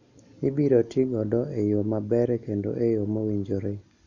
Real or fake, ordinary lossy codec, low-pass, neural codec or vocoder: real; none; 7.2 kHz; none